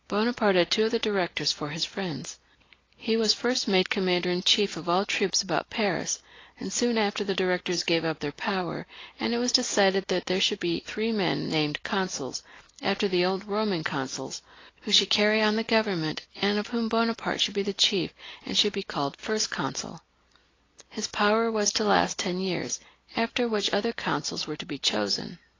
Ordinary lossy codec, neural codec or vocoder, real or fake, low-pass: AAC, 32 kbps; none; real; 7.2 kHz